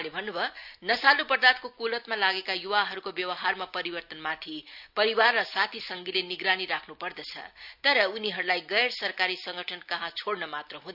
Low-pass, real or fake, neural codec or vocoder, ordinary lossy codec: 5.4 kHz; real; none; none